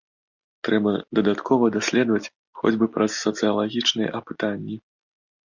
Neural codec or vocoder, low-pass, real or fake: none; 7.2 kHz; real